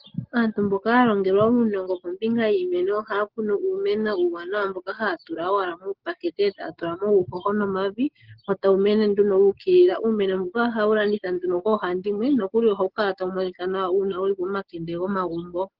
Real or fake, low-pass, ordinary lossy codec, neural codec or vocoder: real; 5.4 kHz; Opus, 16 kbps; none